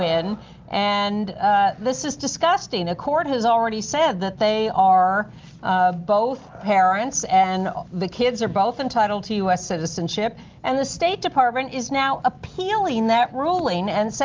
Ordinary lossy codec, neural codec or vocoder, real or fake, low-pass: Opus, 32 kbps; none; real; 7.2 kHz